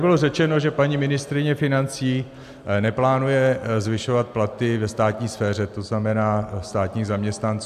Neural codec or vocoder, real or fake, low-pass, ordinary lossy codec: none; real; 14.4 kHz; AAC, 96 kbps